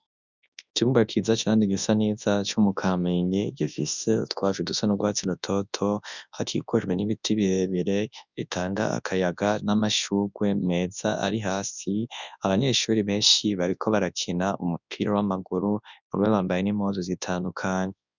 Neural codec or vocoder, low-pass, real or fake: codec, 24 kHz, 0.9 kbps, WavTokenizer, large speech release; 7.2 kHz; fake